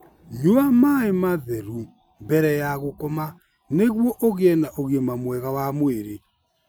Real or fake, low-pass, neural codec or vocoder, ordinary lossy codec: real; none; none; none